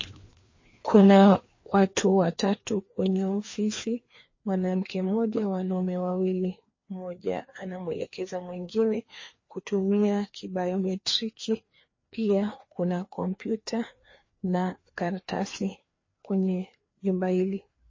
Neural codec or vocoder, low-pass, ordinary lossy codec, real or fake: codec, 16 kHz, 2 kbps, FreqCodec, larger model; 7.2 kHz; MP3, 32 kbps; fake